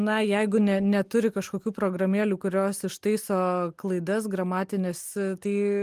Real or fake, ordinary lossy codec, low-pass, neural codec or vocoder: real; Opus, 24 kbps; 14.4 kHz; none